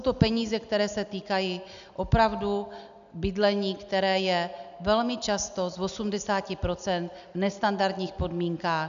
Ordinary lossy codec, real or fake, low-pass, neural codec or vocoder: AAC, 96 kbps; real; 7.2 kHz; none